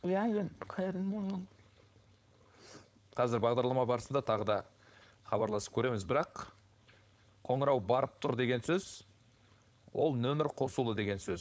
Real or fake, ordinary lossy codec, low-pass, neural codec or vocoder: fake; none; none; codec, 16 kHz, 4.8 kbps, FACodec